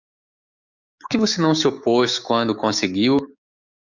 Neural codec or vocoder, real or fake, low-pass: codec, 16 kHz, 6 kbps, DAC; fake; 7.2 kHz